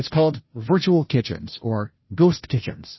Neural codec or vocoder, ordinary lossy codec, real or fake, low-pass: codec, 16 kHz, 0.5 kbps, FunCodec, trained on Chinese and English, 25 frames a second; MP3, 24 kbps; fake; 7.2 kHz